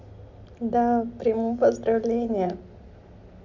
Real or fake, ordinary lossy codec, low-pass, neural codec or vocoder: real; MP3, 64 kbps; 7.2 kHz; none